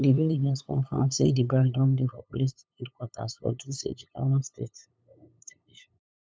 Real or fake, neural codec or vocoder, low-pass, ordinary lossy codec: fake; codec, 16 kHz, 8 kbps, FunCodec, trained on LibriTTS, 25 frames a second; none; none